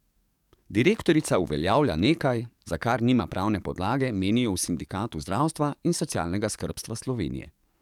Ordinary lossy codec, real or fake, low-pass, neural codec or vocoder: none; fake; 19.8 kHz; codec, 44.1 kHz, 7.8 kbps, DAC